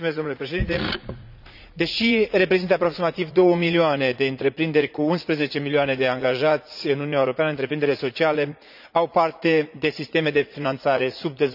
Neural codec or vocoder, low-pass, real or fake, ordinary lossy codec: vocoder, 44.1 kHz, 80 mel bands, Vocos; 5.4 kHz; fake; none